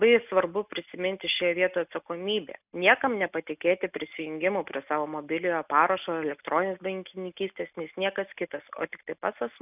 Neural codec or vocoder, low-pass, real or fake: none; 3.6 kHz; real